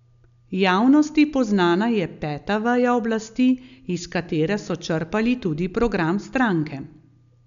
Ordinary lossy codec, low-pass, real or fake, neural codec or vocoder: none; 7.2 kHz; real; none